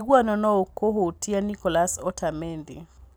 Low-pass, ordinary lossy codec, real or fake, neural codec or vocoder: none; none; real; none